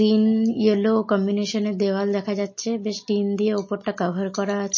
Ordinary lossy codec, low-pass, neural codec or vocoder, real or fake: MP3, 32 kbps; 7.2 kHz; none; real